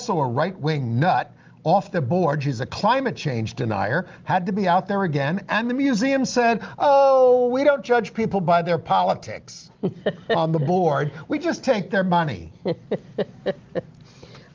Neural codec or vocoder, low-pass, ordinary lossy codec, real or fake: none; 7.2 kHz; Opus, 32 kbps; real